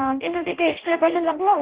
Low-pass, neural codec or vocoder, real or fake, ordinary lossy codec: 3.6 kHz; codec, 16 kHz in and 24 kHz out, 0.6 kbps, FireRedTTS-2 codec; fake; Opus, 16 kbps